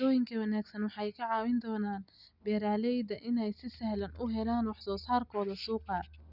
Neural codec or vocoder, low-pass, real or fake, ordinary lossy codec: none; 5.4 kHz; real; none